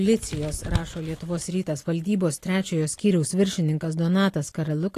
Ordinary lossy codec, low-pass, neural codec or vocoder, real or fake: AAC, 48 kbps; 14.4 kHz; none; real